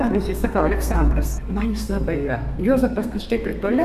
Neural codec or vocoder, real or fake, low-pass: codec, 32 kHz, 1.9 kbps, SNAC; fake; 14.4 kHz